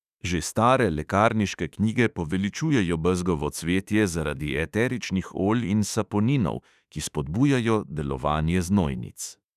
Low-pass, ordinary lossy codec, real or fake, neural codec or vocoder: 14.4 kHz; none; fake; autoencoder, 48 kHz, 32 numbers a frame, DAC-VAE, trained on Japanese speech